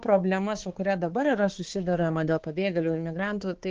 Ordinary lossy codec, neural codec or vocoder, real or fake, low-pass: Opus, 16 kbps; codec, 16 kHz, 4 kbps, X-Codec, HuBERT features, trained on balanced general audio; fake; 7.2 kHz